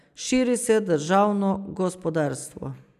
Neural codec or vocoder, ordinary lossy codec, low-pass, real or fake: none; none; 14.4 kHz; real